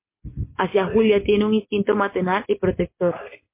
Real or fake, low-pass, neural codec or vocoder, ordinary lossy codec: real; 3.6 kHz; none; MP3, 24 kbps